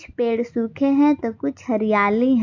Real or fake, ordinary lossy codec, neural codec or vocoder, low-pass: real; AAC, 48 kbps; none; 7.2 kHz